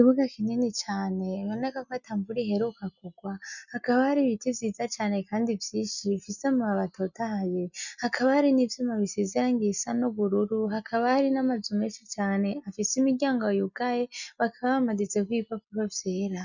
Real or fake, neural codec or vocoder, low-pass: real; none; 7.2 kHz